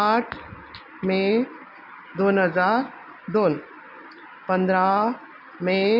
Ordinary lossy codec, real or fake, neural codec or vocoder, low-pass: none; real; none; 5.4 kHz